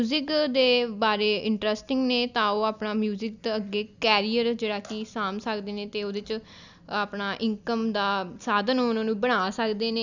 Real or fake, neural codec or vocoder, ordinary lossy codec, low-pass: real; none; none; 7.2 kHz